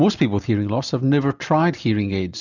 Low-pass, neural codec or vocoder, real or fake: 7.2 kHz; none; real